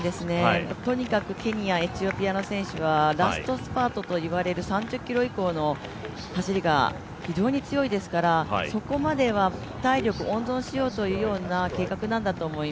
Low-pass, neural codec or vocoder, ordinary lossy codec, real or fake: none; none; none; real